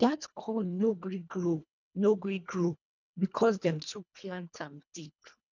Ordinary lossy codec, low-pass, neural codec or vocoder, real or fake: none; 7.2 kHz; codec, 24 kHz, 1.5 kbps, HILCodec; fake